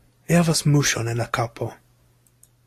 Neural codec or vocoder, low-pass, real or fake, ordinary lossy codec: none; 14.4 kHz; real; AAC, 64 kbps